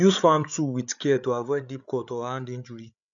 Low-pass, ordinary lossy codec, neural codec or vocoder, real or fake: 7.2 kHz; none; codec, 16 kHz, 8 kbps, FreqCodec, larger model; fake